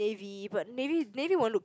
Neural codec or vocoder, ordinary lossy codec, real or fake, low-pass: none; none; real; none